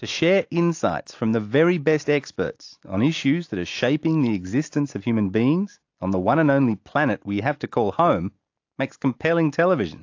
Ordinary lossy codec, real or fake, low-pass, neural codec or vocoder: AAC, 48 kbps; real; 7.2 kHz; none